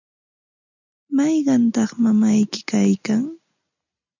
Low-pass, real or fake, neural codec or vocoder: 7.2 kHz; real; none